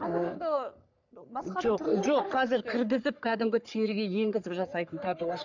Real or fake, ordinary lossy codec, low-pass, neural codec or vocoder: fake; none; 7.2 kHz; codec, 44.1 kHz, 3.4 kbps, Pupu-Codec